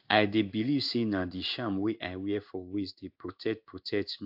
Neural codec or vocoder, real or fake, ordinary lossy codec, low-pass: codec, 16 kHz in and 24 kHz out, 1 kbps, XY-Tokenizer; fake; AAC, 48 kbps; 5.4 kHz